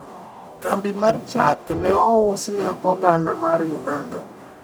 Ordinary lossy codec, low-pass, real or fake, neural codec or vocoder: none; none; fake; codec, 44.1 kHz, 0.9 kbps, DAC